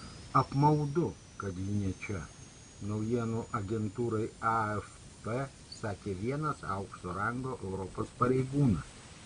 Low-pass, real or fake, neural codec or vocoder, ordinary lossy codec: 9.9 kHz; real; none; MP3, 64 kbps